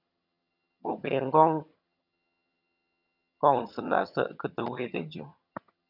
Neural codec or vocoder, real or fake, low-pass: vocoder, 22.05 kHz, 80 mel bands, HiFi-GAN; fake; 5.4 kHz